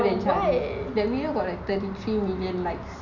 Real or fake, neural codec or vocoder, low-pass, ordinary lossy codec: real; none; 7.2 kHz; none